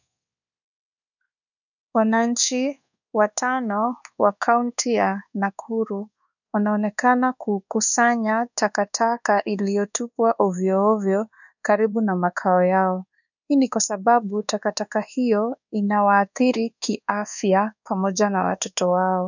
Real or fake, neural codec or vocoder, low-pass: fake; codec, 24 kHz, 1.2 kbps, DualCodec; 7.2 kHz